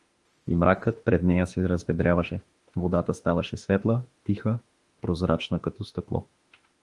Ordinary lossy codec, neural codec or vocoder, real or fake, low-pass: Opus, 24 kbps; autoencoder, 48 kHz, 32 numbers a frame, DAC-VAE, trained on Japanese speech; fake; 10.8 kHz